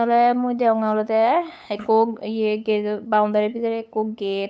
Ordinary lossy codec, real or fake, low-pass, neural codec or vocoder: none; fake; none; codec, 16 kHz, 8 kbps, FunCodec, trained on LibriTTS, 25 frames a second